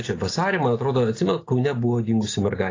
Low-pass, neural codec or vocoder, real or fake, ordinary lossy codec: 7.2 kHz; none; real; AAC, 32 kbps